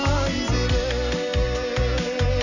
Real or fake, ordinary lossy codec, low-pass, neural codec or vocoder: real; none; 7.2 kHz; none